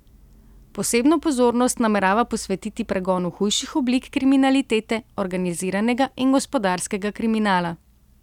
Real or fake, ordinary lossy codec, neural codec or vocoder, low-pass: real; none; none; 19.8 kHz